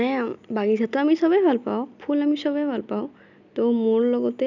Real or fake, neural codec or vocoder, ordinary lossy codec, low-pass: real; none; none; 7.2 kHz